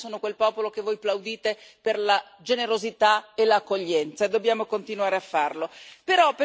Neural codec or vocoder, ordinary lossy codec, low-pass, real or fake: none; none; none; real